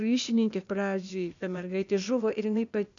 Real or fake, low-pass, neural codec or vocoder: fake; 7.2 kHz; codec, 16 kHz, 0.8 kbps, ZipCodec